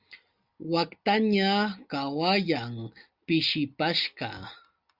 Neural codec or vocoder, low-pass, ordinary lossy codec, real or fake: none; 5.4 kHz; Opus, 64 kbps; real